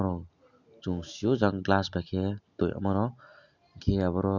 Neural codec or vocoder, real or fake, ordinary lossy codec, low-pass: none; real; none; 7.2 kHz